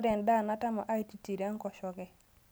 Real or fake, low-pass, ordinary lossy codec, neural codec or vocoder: real; none; none; none